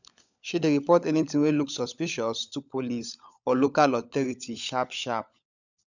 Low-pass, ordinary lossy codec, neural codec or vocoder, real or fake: 7.2 kHz; none; codec, 16 kHz, 16 kbps, FunCodec, trained on LibriTTS, 50 frames a second; fake